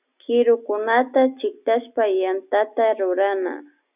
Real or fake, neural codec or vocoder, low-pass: real; none; 3.6 kHz